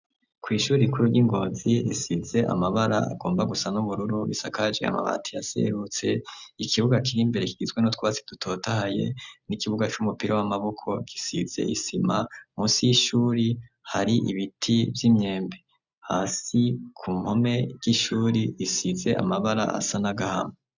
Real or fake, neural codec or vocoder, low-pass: real; none; 7.2 kHz